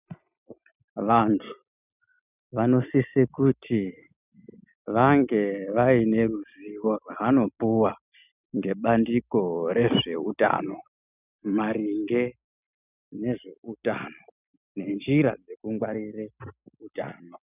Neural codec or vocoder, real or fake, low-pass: none; real; 3.6 kHz